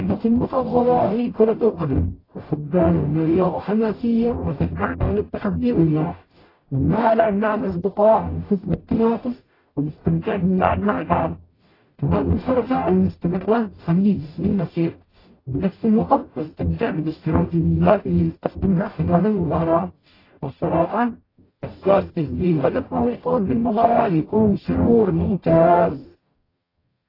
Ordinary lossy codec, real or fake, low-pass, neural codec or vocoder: AAC, 24 kbps; fake; 5.4 kHz; codec, 44.1 kHz, 0.9 kbps, DAC